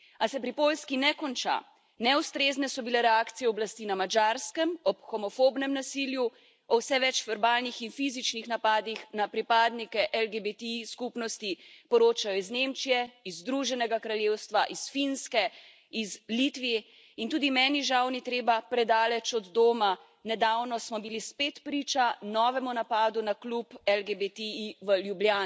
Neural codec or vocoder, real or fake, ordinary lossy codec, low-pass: none; real; none; none